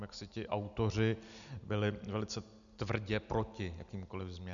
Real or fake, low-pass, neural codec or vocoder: real; 7.2 kHz; none